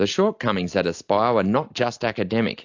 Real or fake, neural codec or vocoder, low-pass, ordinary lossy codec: real; none; 7.2 kHz; AAC, 48 kbps